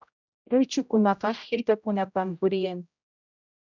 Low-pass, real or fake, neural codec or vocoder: 7.2 kHz; fake; codec, 16 kHz, 0.5 kbps, X-Codec, HuBERT features, trained on general audio